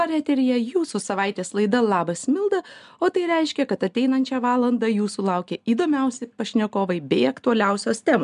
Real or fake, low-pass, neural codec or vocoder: real; 10.8 kHz; none